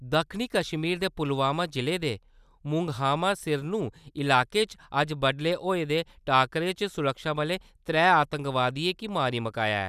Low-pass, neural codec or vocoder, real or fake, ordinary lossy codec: 14.4 kHz; none; real; none